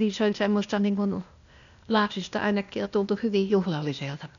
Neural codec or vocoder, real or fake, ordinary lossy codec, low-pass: codec, 16 kHz, 0.8 kbps, ZipCodec; fake; none; 7.2 kHz